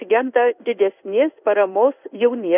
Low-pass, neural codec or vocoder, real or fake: 3.6 kHz; codec, 16 kHz in and 24 kHz out, 1 kbps, XY-Tokenizer; fake